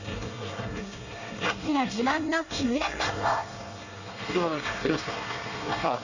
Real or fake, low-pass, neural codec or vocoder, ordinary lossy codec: fake; 7.2 kHz; codec, 24 kHz, 1 kbps, SNAC; none